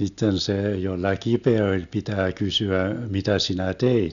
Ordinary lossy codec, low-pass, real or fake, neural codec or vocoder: none; 7.2 kHz; real; none